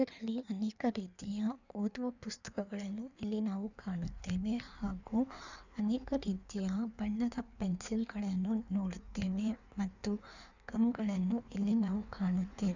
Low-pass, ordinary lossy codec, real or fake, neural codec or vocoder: 7.2 kHz; none; fake; codec, 16 kHz in and 24 kHz out, 1.1 kbps, FireRedTTS-2 codec